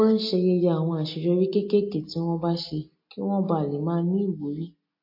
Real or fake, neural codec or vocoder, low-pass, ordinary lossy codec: real; none; 5.4 kHz; MP3, 32 kbps